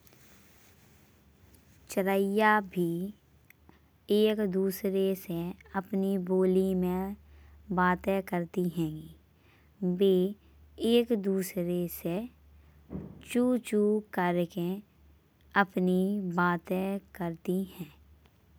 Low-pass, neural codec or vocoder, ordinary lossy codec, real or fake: none; none; none; real